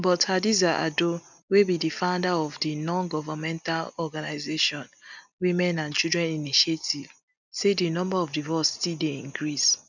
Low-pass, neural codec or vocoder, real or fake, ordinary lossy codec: 7.2 kHz; none; real; none